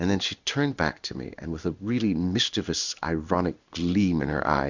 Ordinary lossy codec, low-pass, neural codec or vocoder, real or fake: Opus, 64 kbps; 7.2 kHz; codec, 16 kHz in and 24 kHz out, 1 kbps, XY-Tokenizer; fake